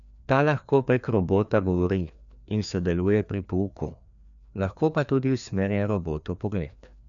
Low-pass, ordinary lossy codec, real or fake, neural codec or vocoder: 7.2 kHz; none; fake; codec, 16 kHz, 2 kbps, FreqCodec, larger model